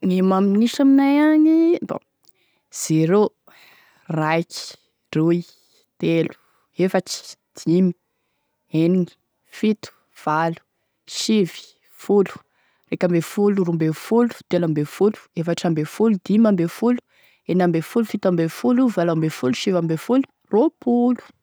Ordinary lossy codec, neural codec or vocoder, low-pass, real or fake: none; none; none; real